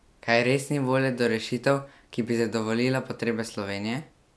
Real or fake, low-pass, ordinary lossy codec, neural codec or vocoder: real; none; none; none